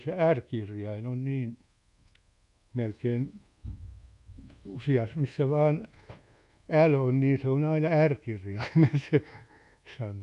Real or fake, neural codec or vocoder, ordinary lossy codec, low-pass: fake; codec, 24 kHz, 1.2 kbps, DualCodec; none; 10.8 kHz